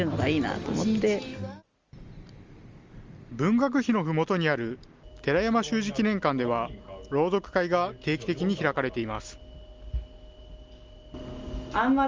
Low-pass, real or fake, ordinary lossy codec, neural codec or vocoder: 7.2 kHz; real; Opus, 32 kbps; none